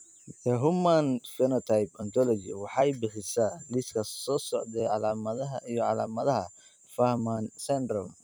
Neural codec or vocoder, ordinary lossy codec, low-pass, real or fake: vocoder, 44.1 kHz, 128 mel bands every 256 samples, BigVGAN v2; none; none; fake